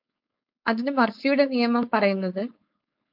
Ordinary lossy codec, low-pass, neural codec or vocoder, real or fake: MP3, 48 kbps; 5.4 kHz; codec, 16 kHz, 4.8 kbps, FACodec; fake